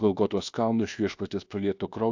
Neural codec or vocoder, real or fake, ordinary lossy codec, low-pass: codec, 24 kHz, 1.2 kbps, DualCodec; fake; AAC, 48 kbps; 7.2 kHz